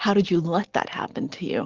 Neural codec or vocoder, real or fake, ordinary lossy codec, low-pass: none; real; Opus, 16 kbps; 7.2 kHz